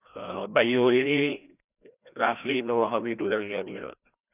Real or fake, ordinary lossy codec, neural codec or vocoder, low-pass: fake; none; codec, 16 kHz, 1 kbps, FreqCodec, larger model; 3.6 kHz